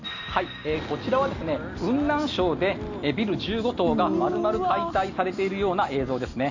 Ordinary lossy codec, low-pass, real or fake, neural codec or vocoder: none; 7.2 kHz; real; none